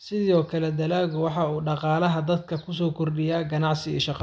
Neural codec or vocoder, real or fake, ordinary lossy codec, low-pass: none; real; none; none